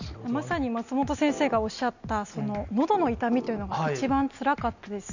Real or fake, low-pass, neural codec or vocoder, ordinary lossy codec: real; 7.2 kHz; none; none